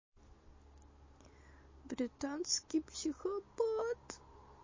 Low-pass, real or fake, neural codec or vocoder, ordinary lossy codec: 7.2 kHz; real; none; MP3, 32 kbps